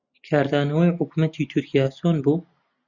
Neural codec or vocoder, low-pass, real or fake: none; 7.2 kHz; real